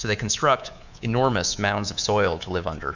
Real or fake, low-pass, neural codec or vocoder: fake; 7.2 kHz; codec, 24 kHz, 3.1 kbps, DualCodec